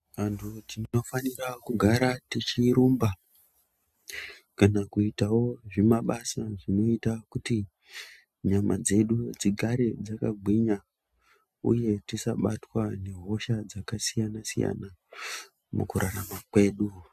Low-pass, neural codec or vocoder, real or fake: 14.4 kHz; none; real